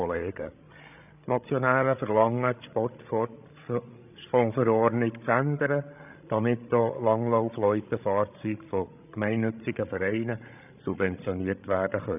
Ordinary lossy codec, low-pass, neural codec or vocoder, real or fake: none; 3.6 kHz; codec, 16 kHz, 16 kbps, FreqCodec, larger model; fake